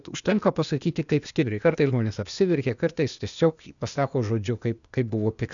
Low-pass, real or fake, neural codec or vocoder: 7.2 kHz; fake; codec, 16 kHz, 0.8 kbps, ZipCodec